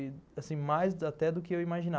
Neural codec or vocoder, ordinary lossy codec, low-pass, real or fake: none; none; none; real